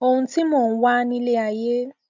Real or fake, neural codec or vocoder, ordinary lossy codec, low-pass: real; none; MP3, 64 kbps; 7.2 kHz